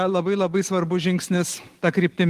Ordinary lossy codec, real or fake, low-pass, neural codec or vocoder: Opus, 24 kbps; real; 14.4 kHz; none